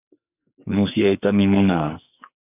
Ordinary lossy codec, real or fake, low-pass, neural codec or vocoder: AAC, 24 kbps; fake; 3.6 kHz; codec, 44.1 kHz, 2.6 kbps, SNAC